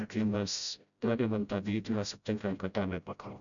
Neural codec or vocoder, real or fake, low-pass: codec, 16 kHz, 0.5 kbps, FreqCodec, smaller model; fake; 7.2 kHz